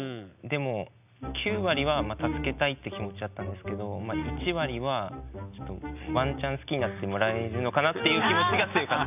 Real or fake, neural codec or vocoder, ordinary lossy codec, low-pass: real; none; none; 3.6 kHz